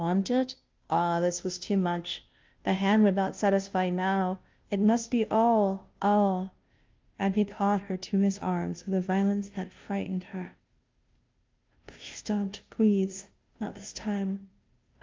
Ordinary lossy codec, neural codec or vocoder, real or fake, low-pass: Opus, 32 kbps; codec, 16 kHz, 0.5 kbps, FunCodec, trained on Chinese and English, 25 frames a second; fake; 7.2 kHz